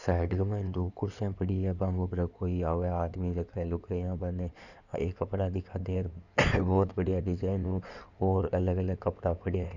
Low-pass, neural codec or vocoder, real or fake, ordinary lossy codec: 7.2 kHz; codec, 16 kHz in and 24 kHz out, 2.2 kbps, FireRedTTS-2 codec; fake; none